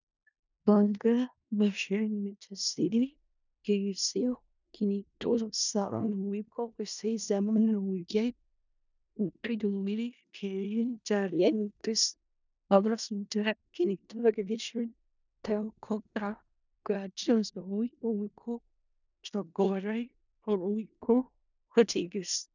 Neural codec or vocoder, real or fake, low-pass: codec, 16 kHz in and 24 kHz out, 0.4 kbps, LongCat-Audio-Codec, four codebook decoder; fake; 7.2 kHz